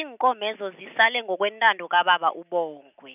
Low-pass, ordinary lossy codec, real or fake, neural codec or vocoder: 3.6 kHz; none; real; none